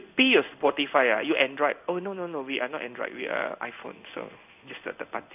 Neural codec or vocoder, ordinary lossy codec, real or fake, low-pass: codec, 16 kHz in and 24 kHz out, 1 kbps, XY-Tokenizer; none; fake; 3.6 kHz